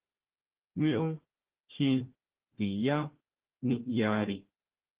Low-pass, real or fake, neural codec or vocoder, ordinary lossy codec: 3.6 kHz; fake; codec, 16 kHz, 1 kbps, FunCodec, trained on Chinese and English, 50 frames a second; Opus, 16 kbps